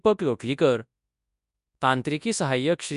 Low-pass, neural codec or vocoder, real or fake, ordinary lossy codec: 10.8 kHz; codec, 24 kHz, 0.9 kbps, WavTokenizer, large speech release; fake; none